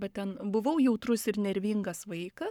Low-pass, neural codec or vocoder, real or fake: 19.8 kHz; codec, 44.1 kHz, 7.8 kbps, Pupu-Codec; fake